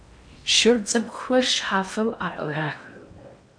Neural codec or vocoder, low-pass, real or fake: codec, 16 kHz in and 24 kHz out, 0.6 kbps, FocalCodec, streaming, 4096 codes; 9.9 kHz; fake